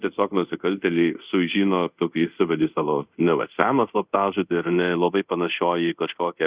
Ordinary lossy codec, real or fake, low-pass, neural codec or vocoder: Opus, 64 kbps; fake; 3.6 kHz; codec, 24 kHz, 0.5 kbps, DualCodec